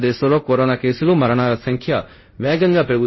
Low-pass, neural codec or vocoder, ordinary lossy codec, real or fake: 7.2 kHz; codec, 24 kHz, 0.5 kbps, DualCodec; MP3, 24 kbps; fake